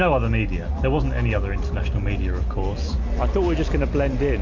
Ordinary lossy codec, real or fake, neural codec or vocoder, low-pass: MP3, 64 kbps; real; none; 7.2 kHz